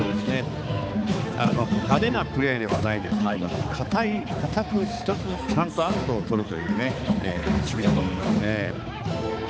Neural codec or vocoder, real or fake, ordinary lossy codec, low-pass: codec, 16 kHz, 4 kbps, X-Codec, HuBERT features, trained on balanced general audio; fake; none; none